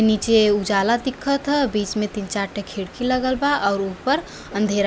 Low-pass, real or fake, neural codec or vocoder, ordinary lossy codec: none; real; none; none